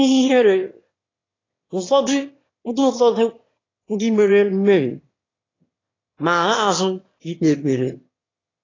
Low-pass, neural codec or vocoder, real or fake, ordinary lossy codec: 7.2 kHz; autoencoder, 22.05 kHz, a latent of 192 numbers a frame, VITS, trained on one speaker; fake; AAC, 32 kbps